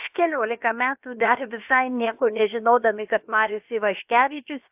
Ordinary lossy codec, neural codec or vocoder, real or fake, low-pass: AAC, 32 kbps; codec, 16 kHz, about 1 kbps, DyCAST, with the encoder's durations; fake; 3.6 kHz